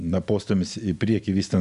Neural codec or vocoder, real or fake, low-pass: none; real; 10.8 kHz